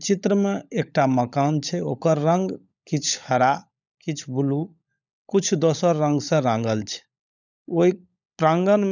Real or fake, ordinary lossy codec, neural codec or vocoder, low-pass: fake; none; codec, 16 kHz, 16 kbps, FunCodec, trained on LibriTTS, 50 frames a second; 7.2 kHz